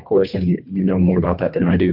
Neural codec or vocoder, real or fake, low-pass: codec, 24 kHz, 1.5 kbps, HILCodec; fake; 5.4 kHz